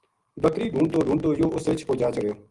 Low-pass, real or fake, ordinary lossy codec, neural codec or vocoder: 10.8 kHz; real; Opus, 24 kbps; none